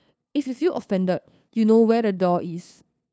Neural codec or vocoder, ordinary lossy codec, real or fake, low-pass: codec, 16 kHz, 2 kbps, FunCodec, trained on LibriTTS, 25 frames a second; none; fake; none